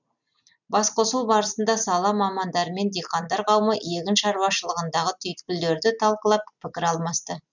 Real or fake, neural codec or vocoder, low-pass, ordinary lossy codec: real; none; 7.2 kHz; none